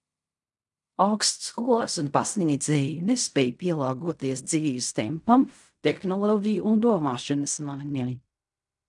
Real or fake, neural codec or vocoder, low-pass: fake; codec, 16 kHz in and 24 kHz out, 0.4 kbps, LongCat-Audio-Codec, fine tuned four codebook decoder; 10.8 kHz